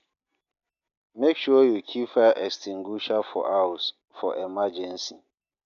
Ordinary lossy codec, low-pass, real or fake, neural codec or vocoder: none; 7.2 kHz; real; none